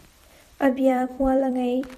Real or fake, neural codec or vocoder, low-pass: fake; vocoder, 44.1 kHz, 128 mel bands every 256 samples, BigVGAN v2; 14.4 kHz